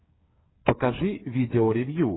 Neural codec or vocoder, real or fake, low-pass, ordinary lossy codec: codec, 16 kHz, 8 kbps, FreqCodec, smaller model; fake; 7.2 kHz; AAC, 16 kbps